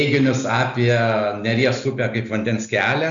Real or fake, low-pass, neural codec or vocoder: real; 7.2 kHz; none